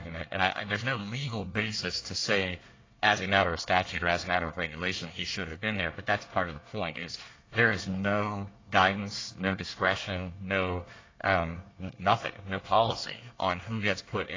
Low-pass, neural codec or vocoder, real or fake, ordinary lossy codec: 7.2 kHz; codec, 24 kHz, 1 kbps, SNAC; fake; AAC, 32 kbps